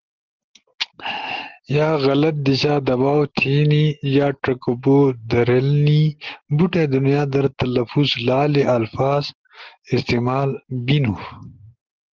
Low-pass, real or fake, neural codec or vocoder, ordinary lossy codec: 7.2 kHz; real; none; Opus, 16 kbps